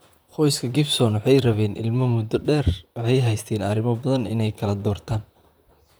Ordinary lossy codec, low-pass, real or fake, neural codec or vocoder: none; none; fake; vocoder, 44.1 kHz, 128 mel bands, Pupu-Vocoder